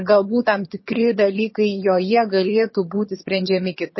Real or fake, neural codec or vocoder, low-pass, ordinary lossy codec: fake; vocoder, 22.05 kHz, 80 mel bands, HiFi-GAN; 7.2 kHz; MP3, 24 kbps